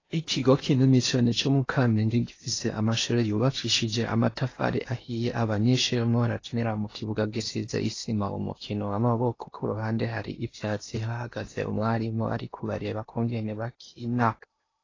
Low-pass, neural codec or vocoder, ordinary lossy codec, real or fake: 7.2 kHz; codec, 16 kHz in and 24 kHz out, 0.8 kbps, FocalCodec, streaming, 65536 codes; AAC, 32 kbps; fake